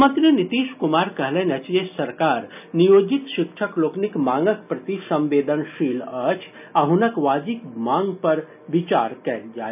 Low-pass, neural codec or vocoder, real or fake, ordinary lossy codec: 3.6 kHz; none; real; none